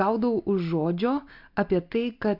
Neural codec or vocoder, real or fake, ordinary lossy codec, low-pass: none; real; MP3, 48 kbps; 5.4 kHz